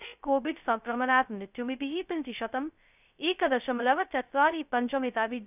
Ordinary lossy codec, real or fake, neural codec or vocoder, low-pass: none; fake; codec, 16 kHz, 0.2 kbps, FocalCodec; 3.6 kHz